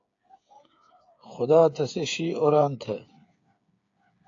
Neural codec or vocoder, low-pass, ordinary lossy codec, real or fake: codec, 16 kHz, 8 kbps, FreqCodec, smaller model; 7.2 kHz; AAC, 48 kbps; fake